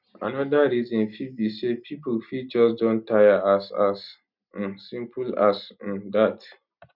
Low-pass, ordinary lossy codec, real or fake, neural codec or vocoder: 5.4 kHz; none; real; none